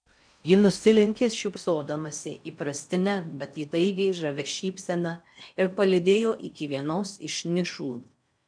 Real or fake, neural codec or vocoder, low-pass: fake; codec, 16 kHz in and 24 kHz out, 0.6 kbps, FocalCodec, streaming, 4096 codes; 9.9 kHz